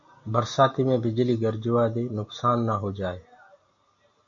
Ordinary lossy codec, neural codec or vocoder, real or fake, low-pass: AAC, 48 kbps; none; real; 7.2 kHz